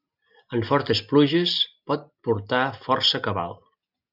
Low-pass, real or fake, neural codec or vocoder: 5.4 kHz; real; none